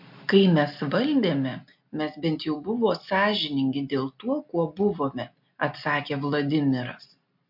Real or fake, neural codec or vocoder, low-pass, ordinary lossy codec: real; none; 5.4 kHz; MP3, 48 kbps